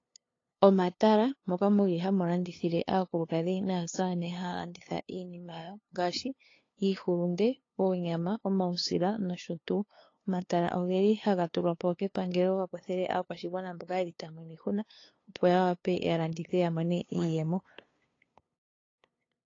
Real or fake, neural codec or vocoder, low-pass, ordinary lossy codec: fake; codec, 16 kHz, 2 kbps, FunCodec, trained on LibriTTS, 25 frames a second; 7.2 kHz; AAC, 32 kbps